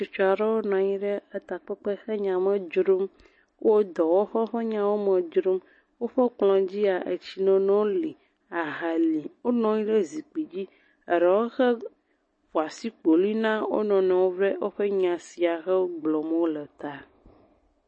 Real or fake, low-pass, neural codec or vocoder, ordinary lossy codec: real; 9.9 kHz; none; MP3, 32 kbps